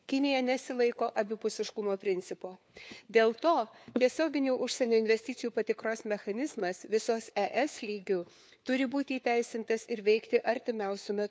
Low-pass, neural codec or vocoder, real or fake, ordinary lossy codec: none; codec, 16 kHz, 4 kbps, FunCodec, trained on LibriTTS, 50 frames a second; fake; none